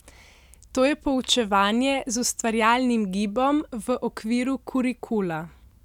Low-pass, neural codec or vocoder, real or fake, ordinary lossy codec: 19.8 kHz; none; real; none